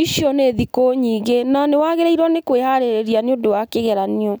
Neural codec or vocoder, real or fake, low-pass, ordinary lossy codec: none; real; none; none